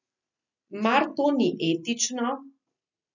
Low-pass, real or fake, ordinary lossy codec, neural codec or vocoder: 7.2 kHz; real; none; none